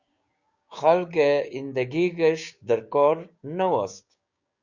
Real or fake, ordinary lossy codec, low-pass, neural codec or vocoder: fake; Opus, 64 kbps; 7.2 kHz; codec, 16 kHz, 6 kbps, DAC